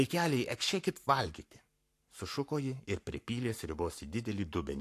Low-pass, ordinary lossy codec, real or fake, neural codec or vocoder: 14.4 kHz; AAC, 64 kbps; fake; codec, 44.1 kHz, 7.8 kbps, Pupu-Codec